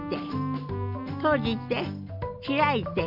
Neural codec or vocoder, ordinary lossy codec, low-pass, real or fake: none; none; 5.4 kHz; real